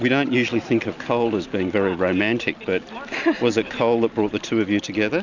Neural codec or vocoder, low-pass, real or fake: none; 7.2 kHz; real